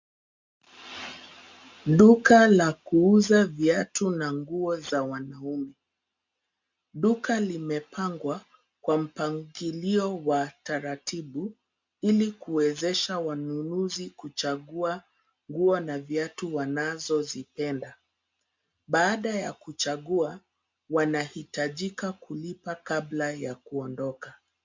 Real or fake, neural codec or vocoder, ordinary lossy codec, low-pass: real; none; MP3, 64 kbps; 7.2 kHz